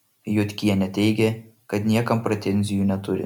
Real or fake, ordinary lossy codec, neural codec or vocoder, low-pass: real; MP3, 96 kbps; none; 19.8 kHz